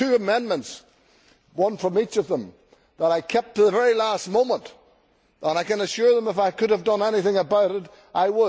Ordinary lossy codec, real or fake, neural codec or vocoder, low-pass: none; real; none; none